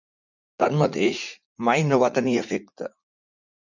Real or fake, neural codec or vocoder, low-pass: fake; vocoder, 44.1 kHz, 80 mel bands, Vocos; 7.2 kHz